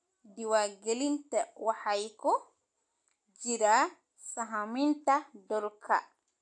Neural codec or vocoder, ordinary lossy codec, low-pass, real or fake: none; AAC, 64 kbps; 9.9 kHz; real